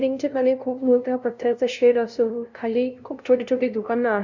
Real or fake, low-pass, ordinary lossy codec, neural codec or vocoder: fake; 7.2 kHz; none; codec, 16 kHz, 0.5 kbps, FunCodec, trained on LibriTTS, 25 frames a second